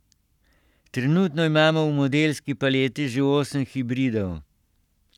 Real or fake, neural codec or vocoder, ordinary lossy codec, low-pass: fake; codec, 44.1 kHz, 7.8 kbps, Pupu-Codec; none; 19.8 kHz